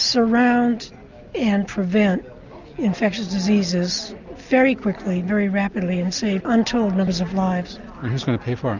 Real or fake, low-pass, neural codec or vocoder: real; 7.2 kHz; none